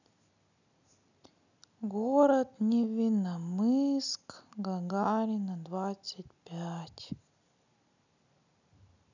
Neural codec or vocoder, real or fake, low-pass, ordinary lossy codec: none; real; 7.2 kHz; none